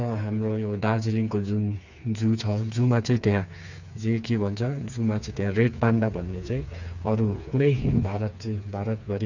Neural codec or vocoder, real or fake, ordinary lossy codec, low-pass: codec, 16 kHz, 4 kbps, FreqCodec, smaller model; fake; none; 7.2 kHz